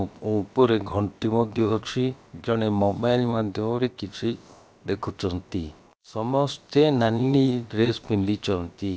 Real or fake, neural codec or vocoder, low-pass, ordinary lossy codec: fake; codec, 16 kHz, about 1 kbps, DyCAST, with the encoder's durations; none; none